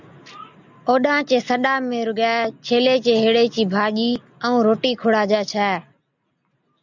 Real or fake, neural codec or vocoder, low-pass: real; none; 7.2 kHz